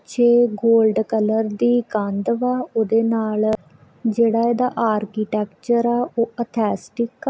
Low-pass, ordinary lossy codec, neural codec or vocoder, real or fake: none; none; none; real